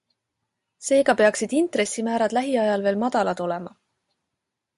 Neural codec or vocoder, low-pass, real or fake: none; 10.8 kHz; real